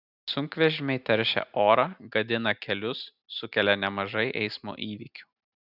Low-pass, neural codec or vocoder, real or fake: 5.4 kHz; none; real